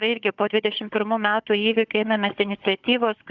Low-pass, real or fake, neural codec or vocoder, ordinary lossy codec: 7.2 kHz; fake; codec, 16 kHz, 16 kbps, FunCodec, trained on Chinese and English, 50 frames a second; Opus, 64 kbps